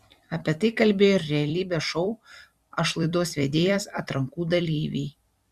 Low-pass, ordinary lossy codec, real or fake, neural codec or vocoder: 14.4 kHz; Opus, 64 kbps; fake; vocoder, 44.1 kHz, 128 mel bands every 256 samples, BigVGAN v2